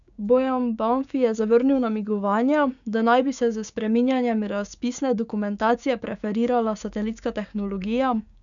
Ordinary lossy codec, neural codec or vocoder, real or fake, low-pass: none; codec, 16 kHz, 6 kbps, DAC; fake; 7.2 kHz